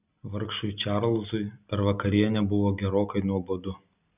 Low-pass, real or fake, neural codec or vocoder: 3.6 kHz; real; none